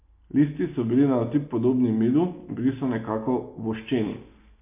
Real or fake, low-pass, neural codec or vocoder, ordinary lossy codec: real; 3.6 kHz; none; MP3, 24 kbps